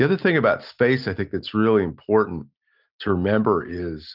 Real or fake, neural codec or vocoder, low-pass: real; none; 5.4 kHz